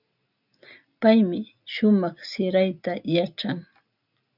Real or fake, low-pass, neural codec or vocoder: real; 5.4 kHz; none